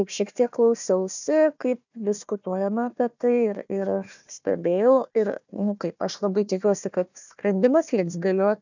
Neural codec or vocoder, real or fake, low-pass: codec, 16 kHz, 1 kbps, FunCodec, trained on Chinese and English, 50 frames a second; fake; 7.2 kHz